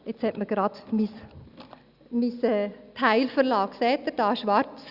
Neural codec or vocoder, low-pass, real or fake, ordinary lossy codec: none; 5.4 kHz; real; Opus, 64 kbps